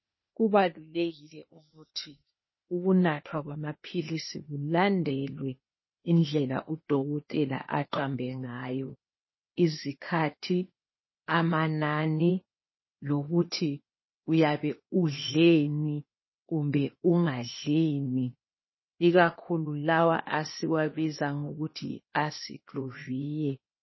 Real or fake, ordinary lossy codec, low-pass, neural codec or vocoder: fake; MP3, 24 kbps; 7.2 kHz; codec, 16 kHz, 0.8 kbps, ZipCodec